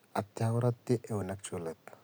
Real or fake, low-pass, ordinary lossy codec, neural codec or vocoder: real; none; none; none